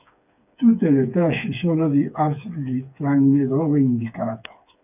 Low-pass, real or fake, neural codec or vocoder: 3.6 kHz; fake; codec, 16 kHz, 4 kbps, FreqCodec, smaller model